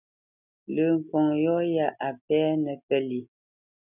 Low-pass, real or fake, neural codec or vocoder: 3.6 kHz; real; none